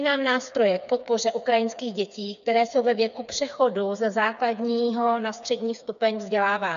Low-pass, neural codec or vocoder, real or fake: 7.2 kHz; codec, 16 kHz, 4 kbps, FreqCodec, smaller model; fake